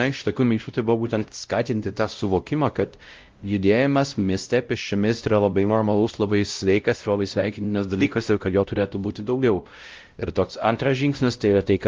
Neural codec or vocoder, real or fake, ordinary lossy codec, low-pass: codec, 16 kHz, 0.5 kbps, X-Codec, WavLM features, trained on Multilingual LibriSpeech; fake; Opus, 32 kbps; 7.2 kHz